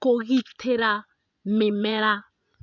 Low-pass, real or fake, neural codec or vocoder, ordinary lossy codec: 7.2 kHz; fake; vocoder, 24 kHz, 100 mel bands, Vocos; none